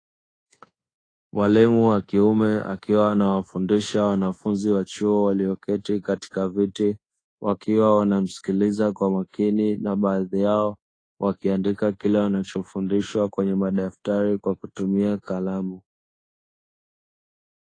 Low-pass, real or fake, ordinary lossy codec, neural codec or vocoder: 9.9 kHz; fake; AAC, 32 kbps; codec, 24 kHz, 1.2 kbps, DualCodec